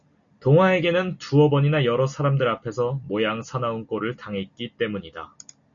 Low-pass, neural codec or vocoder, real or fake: 7.2 kHz; none; real